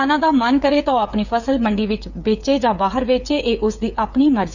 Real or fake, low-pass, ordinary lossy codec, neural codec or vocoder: fake; 7.2 kHz; none; codec, 16 kHz, 8 kbps, FreqCodec, smaller model